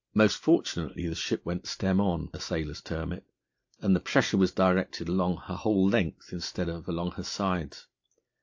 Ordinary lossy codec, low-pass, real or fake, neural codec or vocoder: AAC, 48 kbps; 7.2 kHz; real; none